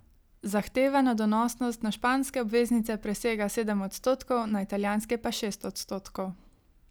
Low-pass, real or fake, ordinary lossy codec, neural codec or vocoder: none; real; none; none